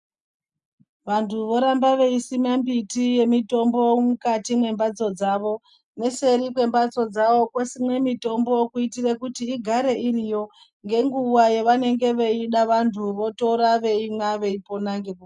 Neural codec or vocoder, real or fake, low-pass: none; real; 10.8 kHz